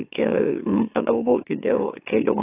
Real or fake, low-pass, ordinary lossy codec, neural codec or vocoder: fake; 3.6 kHz; AAC, 24 kbps; autoencoder, 44.1 kHz, a latent of 192 numbers a frame, MeloTTS